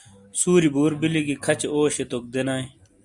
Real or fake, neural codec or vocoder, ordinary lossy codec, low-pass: real; none; Opus, 64 kbps; 10.8 kHz